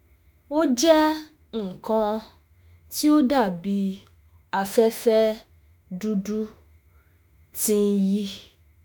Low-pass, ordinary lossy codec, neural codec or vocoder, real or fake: none; none; autoencoder, 48 kHz, 32 numbers a frame, DAC-VAE, trained on Japanese speech; fake